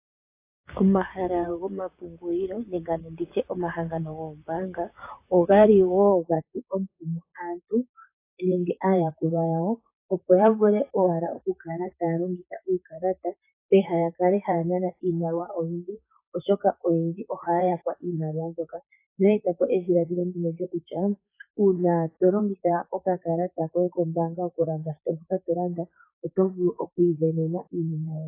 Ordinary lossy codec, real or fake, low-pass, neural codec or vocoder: AAC, 24 kbps; fake; 3.6 kHz; vocoder, 44.1 kHz, 128 mel bands, Pupu-Vocoder